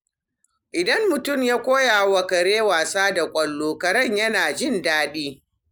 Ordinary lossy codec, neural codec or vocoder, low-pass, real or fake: none; none; none; real